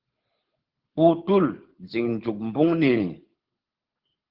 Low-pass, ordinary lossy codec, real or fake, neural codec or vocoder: 5.4 kHz; Opus, 16 kbps; fake; codec, 24 kHz, 6 kbps, HILCodec